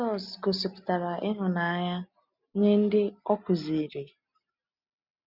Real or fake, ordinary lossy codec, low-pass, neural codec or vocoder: real; none; 5.4 kHz; none